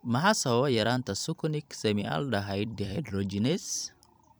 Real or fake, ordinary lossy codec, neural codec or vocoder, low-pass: fake; none; vocoder, 44.1 kHz, 128 mel bands every 256 samples, BigVGAN v2; none